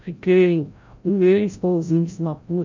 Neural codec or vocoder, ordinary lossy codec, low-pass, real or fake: codec, 16 kHz, 0.5 kbps, FreqCodec, larger model; MP3, 64 kbps; 7.2 kHz; fake